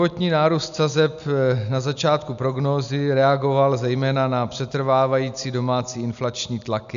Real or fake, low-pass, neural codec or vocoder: real; 7.2 kHz; none